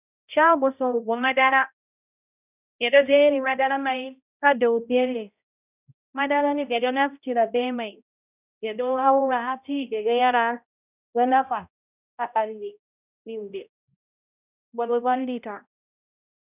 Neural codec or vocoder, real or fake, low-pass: codec, 16 kHz, 0.5 kbps, X-Codec, HuBERT features, trained on balanced general audio; fake; 3.6 kHz